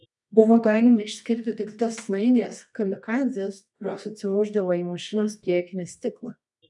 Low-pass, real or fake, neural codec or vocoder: 10.8 kHz; fake; codec, 24 kHz, 0.9 kbps, WavTokenizer, medium music audio release